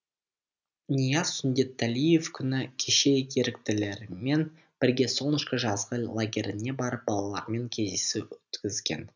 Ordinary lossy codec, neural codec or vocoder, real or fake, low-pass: none; none; real; 7.2 kHz